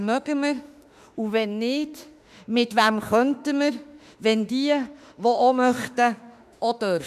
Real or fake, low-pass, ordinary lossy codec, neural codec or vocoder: fake; 14.4 kHz; none; autoencoder, 48 kHz, 32 numbers a frame, DAC-VAE, trained on Japanese speech